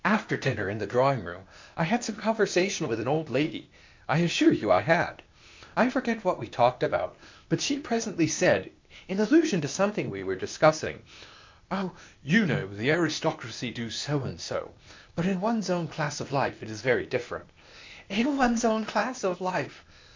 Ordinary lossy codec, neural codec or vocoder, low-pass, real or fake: MP3, 48 kbps; codec, 16 kHz, 0.8 kbps, ZipCodec; 7.2 kHz; fake